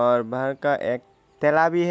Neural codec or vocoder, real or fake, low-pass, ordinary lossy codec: none; real; none; none